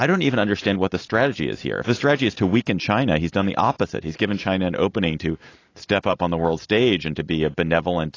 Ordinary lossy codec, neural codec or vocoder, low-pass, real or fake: AAC, 32 kbps; none; 7.2 kHz; real